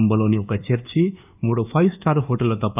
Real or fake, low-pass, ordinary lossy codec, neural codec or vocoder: fake; 3.6 kHz; none; codec, 24 kHz, 1.2 kbps, DualCodec